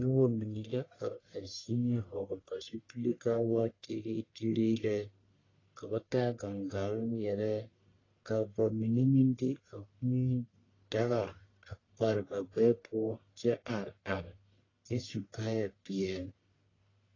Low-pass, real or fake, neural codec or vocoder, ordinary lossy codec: 7.2 kHz; fake; codec, 44.1 kHz, 1.7 kbps, Pupu-Codec; AAC, 32 kbps